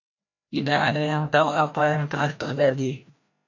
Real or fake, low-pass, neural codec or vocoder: fake; 7.2 kHz; codec, 16 kHz, 1 kbps, FreqCodec, larger model